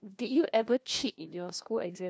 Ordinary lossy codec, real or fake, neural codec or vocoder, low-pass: none; fake; codec, 16 kHz, 1 kbps, FunCodec, trained on LibriTTS, 50 frames a second; none